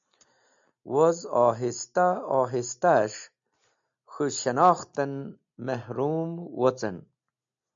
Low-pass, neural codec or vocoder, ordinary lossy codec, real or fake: 7.2 kHz; none; MP3, 64 kbps; real